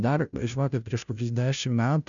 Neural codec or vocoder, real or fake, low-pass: codec, 16 kHz, 0.5 kbps, FunCodec, trained on Chinese and English, 25 frames a second; fake; 7.2 kHz